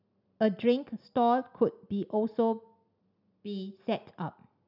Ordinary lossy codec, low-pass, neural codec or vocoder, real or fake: none; 5.4 kHz; none; real